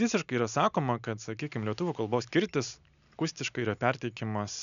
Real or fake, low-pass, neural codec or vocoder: real; 7.2 kHz; none